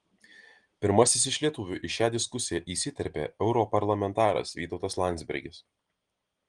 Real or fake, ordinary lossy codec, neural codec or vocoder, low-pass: real; Opus, 24 kbps; none; 9.9 kHz